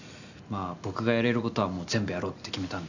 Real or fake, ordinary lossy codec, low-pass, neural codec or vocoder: real; none; 7.2 kHz; none